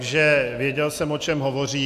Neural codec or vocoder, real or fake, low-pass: none; real; 14.4 kHz